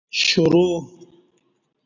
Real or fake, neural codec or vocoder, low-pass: real; none; 7.2 kHz